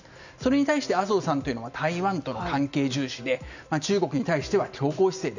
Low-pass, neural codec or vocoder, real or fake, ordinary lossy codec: 7.2 kHz; none; real; none